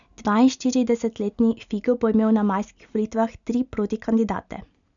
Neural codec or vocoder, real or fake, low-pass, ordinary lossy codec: none; real; 7.2 kHz; none